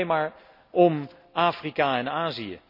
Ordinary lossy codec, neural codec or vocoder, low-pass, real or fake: none; none; 5.4 kHz; real